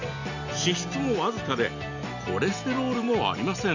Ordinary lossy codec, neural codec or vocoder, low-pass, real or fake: none; none; 7.2 kHz; real